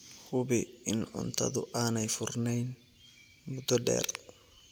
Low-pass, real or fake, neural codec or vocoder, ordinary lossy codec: none; real; none; none